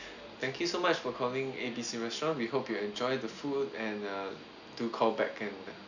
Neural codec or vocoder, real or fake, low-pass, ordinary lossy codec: none; real; 7.2 kHz; none